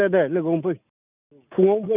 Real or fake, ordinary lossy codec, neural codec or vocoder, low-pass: real; none; none; 3.6 kHz